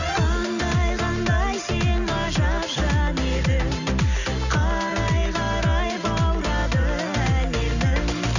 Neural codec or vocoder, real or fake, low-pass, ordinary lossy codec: none; real; 7.2 kHz; none